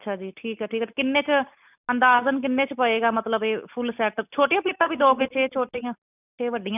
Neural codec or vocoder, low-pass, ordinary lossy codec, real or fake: none; 3.6 kHz; none; real